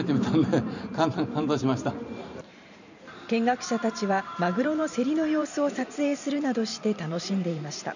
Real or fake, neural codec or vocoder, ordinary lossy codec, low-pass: fake; vocoder, 44.1 kHz, 128 mel bands every 512 samples, BigVGAN v2; none; 7.2 kHz